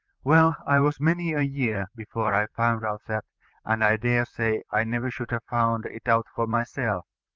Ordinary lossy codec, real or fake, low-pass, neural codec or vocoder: Opus, 24 kbps; fake; 7.2 kHz; vocoder, 44.1 kHz, 128 mel bands, Pupu-Vocoder